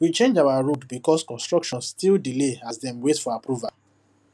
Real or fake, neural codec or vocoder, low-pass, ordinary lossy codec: real; none; none; none